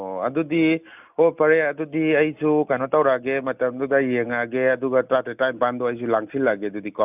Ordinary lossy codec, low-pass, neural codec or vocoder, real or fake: none; 3.6 kHz; none; real